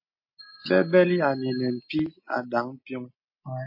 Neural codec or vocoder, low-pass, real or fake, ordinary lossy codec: none; 5.4 kHz; real; MP3, 32 kbps